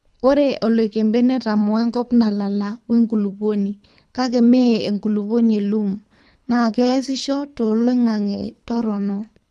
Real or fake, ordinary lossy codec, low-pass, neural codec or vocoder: fake; none; none; codec, 24 kHz, 3 kbps, HILCodec